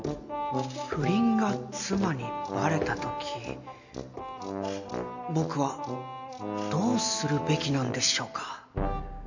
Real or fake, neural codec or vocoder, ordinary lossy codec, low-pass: real; none; none; 7.2 kHz